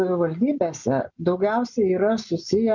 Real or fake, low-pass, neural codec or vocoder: real; 7.2 kHz; none